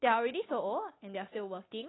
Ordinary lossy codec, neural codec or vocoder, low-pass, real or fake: AAC, 16 kbps; codec, 16 kHz, 8 kbps, FunCodec, trained on Chinese and English, 25 frames a second; 7.2 kHz; fake